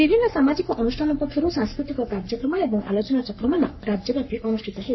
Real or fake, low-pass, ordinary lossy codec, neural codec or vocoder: fake; 7.2 kHz; MP3, 24 kbps; codec, 44.1 kHz, 3.4 kbps, Pupu-Codec